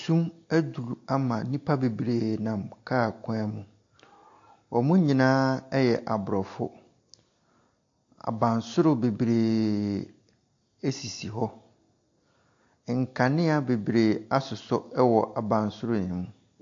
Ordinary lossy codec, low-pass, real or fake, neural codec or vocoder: AAC, 48 kbps; 7.2 kHz; real; none